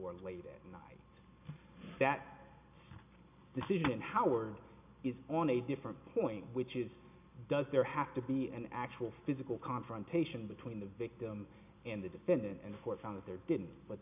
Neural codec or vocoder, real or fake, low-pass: none; real; 3.6 kHz